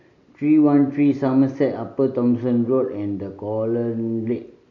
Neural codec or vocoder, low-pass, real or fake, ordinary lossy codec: none; 7.2 kHz; real; none